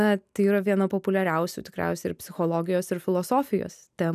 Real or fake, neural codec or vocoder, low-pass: real; none; 14.4 kHz